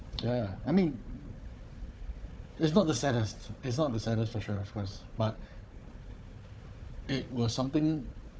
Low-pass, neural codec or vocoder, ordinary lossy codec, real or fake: none; codec, 16 kHz, 4 kbps, FunCodec, trained on Chinese and English, 50 frames a second; none; fake